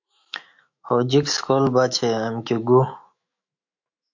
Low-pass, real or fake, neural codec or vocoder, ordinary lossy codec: 7.2 kHz; fake; autoencoder, 48 kHz, 128 numbers a frame, DAC-VAE, trained on Japanese speech; MP3, 48 kbps